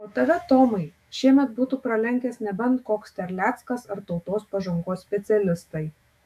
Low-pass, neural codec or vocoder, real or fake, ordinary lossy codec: 14.4 kHz; autoencoder, 48 kHz, 128 numbers a frame, DAC-VAE, trained on Japanese speech; fake; AAC, 96 kbps